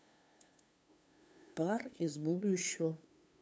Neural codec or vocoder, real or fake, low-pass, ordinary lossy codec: codec, 16 kHz, 2 kbps, FunCodec, trained on LibriTTS, 25 frames a second; fake; none; none